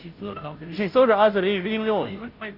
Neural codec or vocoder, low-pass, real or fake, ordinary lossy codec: codec, 16 kHz, 0.5 kbps, FunCodec, trained on Chinese and English, 25 frames a second; 5.4 kHz; fake; none